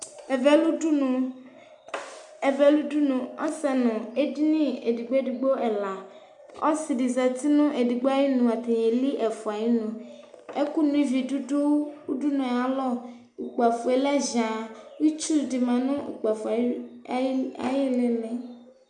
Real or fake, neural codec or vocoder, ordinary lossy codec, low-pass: real; none; MP3, 96 kbps; 10.8 kHz